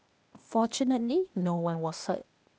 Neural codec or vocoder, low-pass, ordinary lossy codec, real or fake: codec, 16 kHz, 0.8 kbps, ZipCodec; none; none; fake